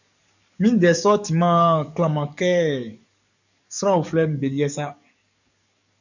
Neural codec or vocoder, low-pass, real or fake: codec, 44.1 kHz, 7.8 kbps, DAC; 7.2 kHz; fake